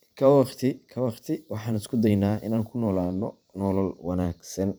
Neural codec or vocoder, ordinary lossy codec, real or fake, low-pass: vocoder, 44.1 kHz, 128 mel bands every 512 samples, BigVGAN v2; none; fake; none